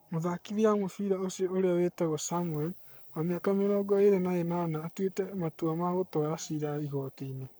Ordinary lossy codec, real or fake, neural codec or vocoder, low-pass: none; fake; codec, 44.1 kHz, 7.8 kbps, Pupu-Codec; none